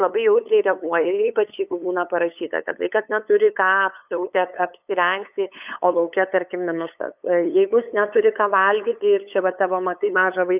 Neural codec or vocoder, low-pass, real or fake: codec, 16 kHz, 8 kbps, FunCodec, trained on LibriTTS, 25 frames a second; 3.6 kHz; fake